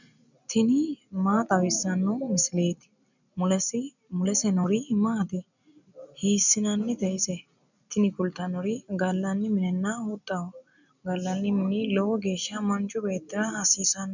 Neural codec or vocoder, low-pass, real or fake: none; 7.2 kHz; real